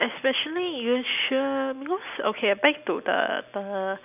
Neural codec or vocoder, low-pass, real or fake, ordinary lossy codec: none; 3.6 kHz; real; none